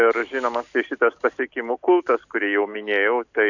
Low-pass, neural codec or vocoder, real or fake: 7.2 kHz; none; real